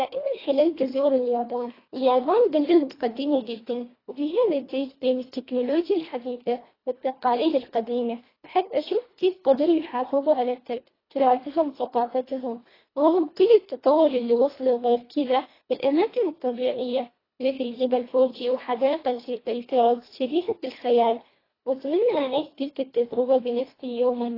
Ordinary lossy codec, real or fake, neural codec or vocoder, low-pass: AAC, 24 kbps; fake; codec, 24 kHz, 1.5 kbps, HILCodec; 5.4 kHz